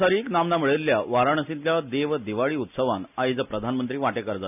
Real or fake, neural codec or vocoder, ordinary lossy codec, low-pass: real; none; none; 3.6 kHz